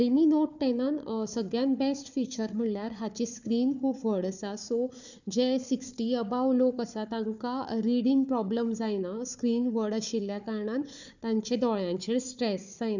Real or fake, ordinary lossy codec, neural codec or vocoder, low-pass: fake; none; codec, 16 kHz, 4 kbps, FunCodec, trained on Chinese and English, 50 frames a second; 7.2 kHz